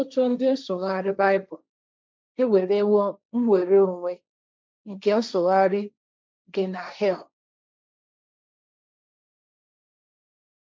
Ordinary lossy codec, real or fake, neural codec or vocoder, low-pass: none; fake; codec, 16 kHz, 1.1 kbps, Voila-Tokenizer; none